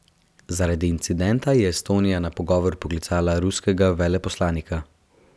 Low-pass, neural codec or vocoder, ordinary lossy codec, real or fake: none; none; none; real